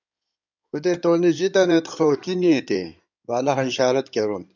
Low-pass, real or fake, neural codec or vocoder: 7.2 kHz; fake; codec, 16 kHz in and 24 kHz out, 2.2 kbps, FireRedTTS-2 codec